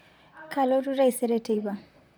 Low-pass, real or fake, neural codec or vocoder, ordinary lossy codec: none; fake; vocoder, 44.1 kHz, 128 mel bands, Pupu-Vocoder; none